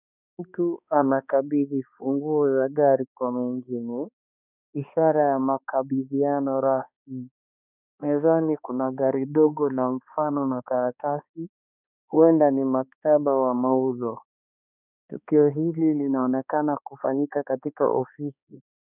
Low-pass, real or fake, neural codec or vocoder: 3.6 kHz; fake; codec, 16 kHz, 2 kbps, X-Codec, HuBERT features, trained on balanced general audio